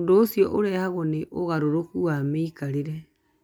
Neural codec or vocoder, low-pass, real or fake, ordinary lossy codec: none; 19.8 kHz; real; none